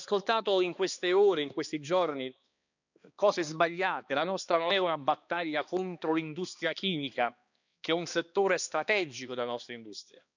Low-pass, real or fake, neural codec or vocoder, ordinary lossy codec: 7.2 kHz; fake; codec, 16 kHz, 2 kbps, X-Codec, HuBERT features, trained on balanced general audio; none